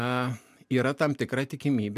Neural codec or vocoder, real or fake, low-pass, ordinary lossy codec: none; real; 14.4 kHz; MP3, 96 kbps